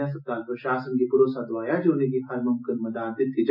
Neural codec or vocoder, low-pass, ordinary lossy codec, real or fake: none; 5.4 kHz; none; real